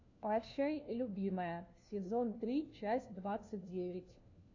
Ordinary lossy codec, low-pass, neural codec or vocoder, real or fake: AAC, 48 kbps; 7.2 kHz; codec, 16 kHz, 1 kbps, FunCodec, trained on LibriTTS, 50 frames a second; fake